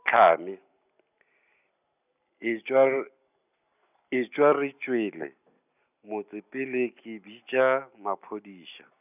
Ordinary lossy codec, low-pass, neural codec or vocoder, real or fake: none; 3.6 kHz; none; real